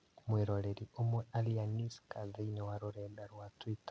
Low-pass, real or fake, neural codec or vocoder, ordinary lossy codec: none; real; none; none